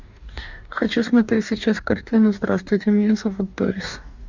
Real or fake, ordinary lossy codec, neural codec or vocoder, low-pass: fake; Opus, 64 kbps; codec, 44.1 kHz, 2.6 kbps, DAC; 7.2 kHz